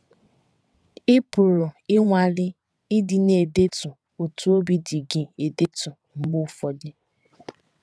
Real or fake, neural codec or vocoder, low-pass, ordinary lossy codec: fake; vocoder, 22.05 kHz, 80 mel bands, WaveNeXt; none; none